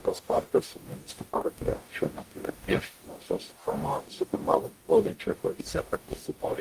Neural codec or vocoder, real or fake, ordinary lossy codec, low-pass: codec, 44.1 kHz, 0.9 kbps, DAC; fake; Opus, 32 kbps; 14.4 kHz